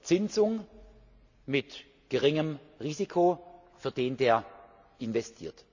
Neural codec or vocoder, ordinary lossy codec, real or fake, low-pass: none; none; real; 7.2 kHz